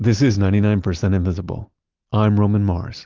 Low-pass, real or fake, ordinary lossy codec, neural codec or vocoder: 7.2 kHz; real; Opus, 32 kbps; none